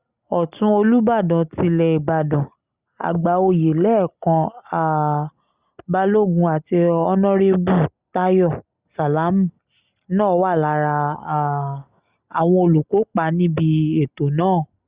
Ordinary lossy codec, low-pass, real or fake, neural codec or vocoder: Opus, 64 kbps; 3.6 kHz; real; none